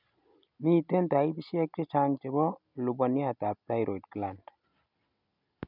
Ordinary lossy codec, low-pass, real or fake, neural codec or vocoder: none; 5.4 kHz; real; none